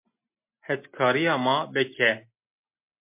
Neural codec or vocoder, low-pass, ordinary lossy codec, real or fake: none; 3.6 kHz; MP3, 32 kbps; real